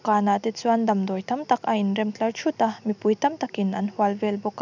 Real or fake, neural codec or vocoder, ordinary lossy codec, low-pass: real; none; none; 7.2 kHz